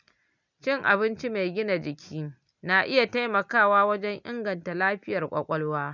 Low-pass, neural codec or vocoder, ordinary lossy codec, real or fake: 7.2 kHz; none; none; real